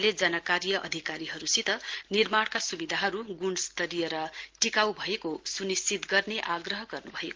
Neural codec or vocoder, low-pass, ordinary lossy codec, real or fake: none; 7.2 kHz; Opus, 16 kbps; real